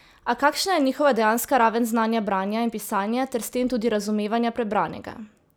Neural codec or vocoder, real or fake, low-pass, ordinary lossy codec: none; real; none; none